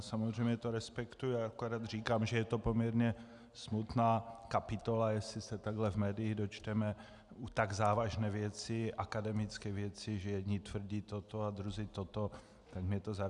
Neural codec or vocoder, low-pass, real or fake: none; 10.8 kHz; real